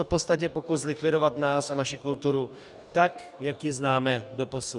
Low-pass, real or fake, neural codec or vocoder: 10.8 kHz; fake; codec, 44.1 kHz, 2.6 kbps, DAC